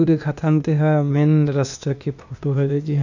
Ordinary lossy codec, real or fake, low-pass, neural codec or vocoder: none; fake; 7.2 kHz; codec, 16 kHz, 0.8 kbps, ZipCodec